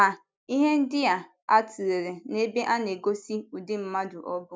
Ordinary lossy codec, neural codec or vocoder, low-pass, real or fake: none; none; none; real